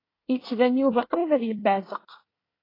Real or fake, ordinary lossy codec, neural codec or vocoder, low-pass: fake; AAC, 24 kbps; codec, 24 kHz, 1 kbps, SNAC; 5.4 kHz